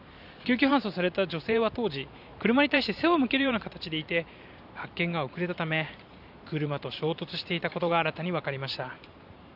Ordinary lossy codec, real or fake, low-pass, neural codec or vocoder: none; fake; 5.4 kHz; vocoder, 44.1 kHz, 128 mel bands every 512 samples, BigVGAN v2